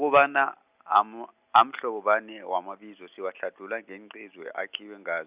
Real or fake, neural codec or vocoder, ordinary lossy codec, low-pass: real; none; Opus, 64 kbps; 3.6 kHz